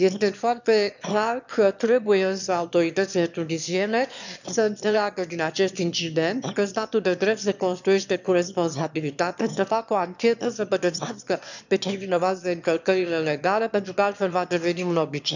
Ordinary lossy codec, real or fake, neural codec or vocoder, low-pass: none; fake; autoencoder, 22.05 kHz, a latent of 192 numbers a frame, VITS, trained on one speaker; 7.2 kHz